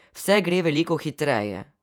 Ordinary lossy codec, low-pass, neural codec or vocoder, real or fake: none; 19.8 kHz; vocoder, 48 kHz, 128 mel bands, Vocos; fake